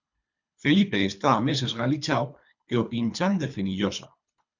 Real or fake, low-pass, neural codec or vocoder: fake; 7.2 kHz; codec, 24 kHz, 3 kbps, HILCodec